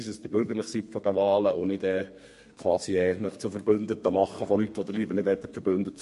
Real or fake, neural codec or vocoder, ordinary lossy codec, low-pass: fake; codec, 32 kHz, 1.9 kbps, SNAC; MP3, 48 kbps; 14.4 kHz